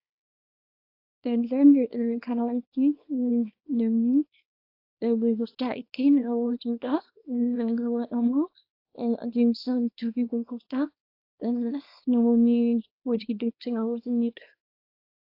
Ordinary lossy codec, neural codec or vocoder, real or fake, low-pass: AAC, 32 kbps; codec, 24 kHz, 0.9 kbps, WavTokenizer, small release; fake; 5.4 kHz